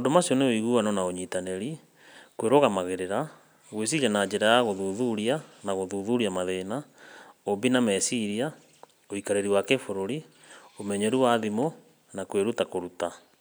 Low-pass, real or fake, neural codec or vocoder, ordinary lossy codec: none; real; none; none